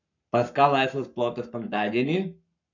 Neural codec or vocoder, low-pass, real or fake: codec, 44.1 kHz, 7.8 kbps, Pupu-Codec; 7.2 kHz; fake